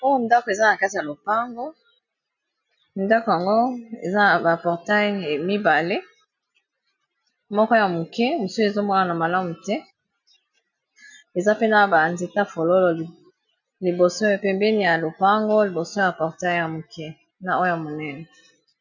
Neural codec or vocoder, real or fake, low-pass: none; real; 7.2 kHz